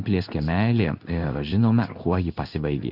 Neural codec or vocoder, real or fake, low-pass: codec, 16 kHz in and 24 kHz out, 1 kbps, XY-Tokenizer; fake; 5.4 kHz